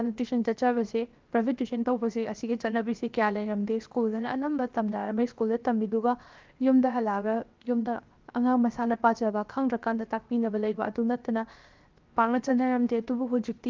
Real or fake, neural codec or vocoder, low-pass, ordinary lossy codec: fake; codec, 16 kHz, 0.7 kbps, FocalCodec; 7.2 kHz; Opus, 32 kbps